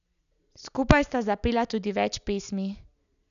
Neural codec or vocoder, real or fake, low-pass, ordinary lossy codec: none; real; 7.2 kHz; none